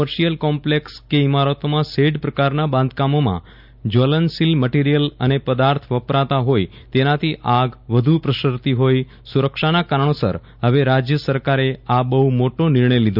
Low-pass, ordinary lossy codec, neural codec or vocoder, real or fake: 5.4 kHz; none; none; real